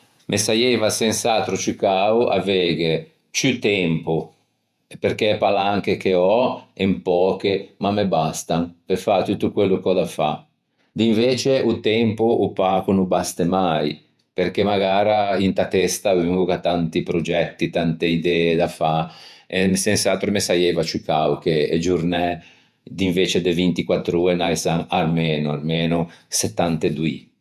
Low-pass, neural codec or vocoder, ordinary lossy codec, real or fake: 14.4 kHz; vocoder, 48 kHz, 128 mel bands, Vocos; none; fake